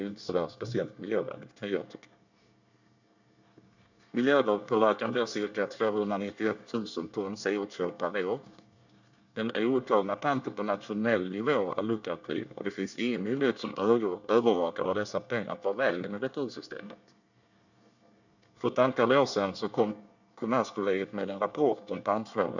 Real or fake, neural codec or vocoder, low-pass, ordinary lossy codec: fake; codec, 24 kHz, 1 kbps, SNAC; 7.2 kHz; none